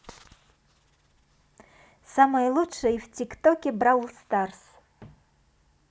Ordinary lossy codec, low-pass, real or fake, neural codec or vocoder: none; none; real; none